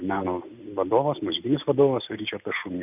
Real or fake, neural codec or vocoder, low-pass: real; none; 3.6 kHz